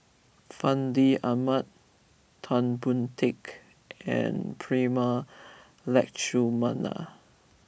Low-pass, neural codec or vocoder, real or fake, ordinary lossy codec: none; none; real; none